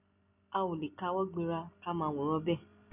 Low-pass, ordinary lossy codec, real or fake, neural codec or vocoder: 3.6 kHz; none; real; none